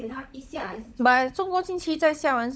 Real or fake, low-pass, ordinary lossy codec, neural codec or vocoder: fake; none; none; codec, 16 kHz, 16 kbps, FunCodec, trained on LibriTTS, 50 frames a second